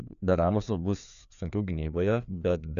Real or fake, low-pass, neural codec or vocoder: fake; 7.2 kHz; codec, 16 kHz, 2 kbps, FreqCodec, larger model